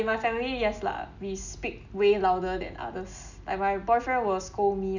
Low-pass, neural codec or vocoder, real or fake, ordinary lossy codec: 7.2 kHz; none; real; none